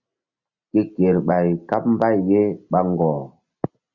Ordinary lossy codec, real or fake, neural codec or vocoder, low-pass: Opus, 64 kbps; real; none; 7.2 kHz